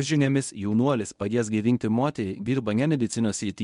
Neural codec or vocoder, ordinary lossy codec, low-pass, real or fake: codec, 24 kHz, 0.9 kbps, WavTokenizer, medium speech release version 1; MP3, 64 kbps; 10.8 kHz; fake